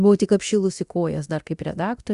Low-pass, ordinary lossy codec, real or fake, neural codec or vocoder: 10.8 kHz; Opus, 64 kbps; fake; codec, 24 kHz, 0.9 kbps, DualCodec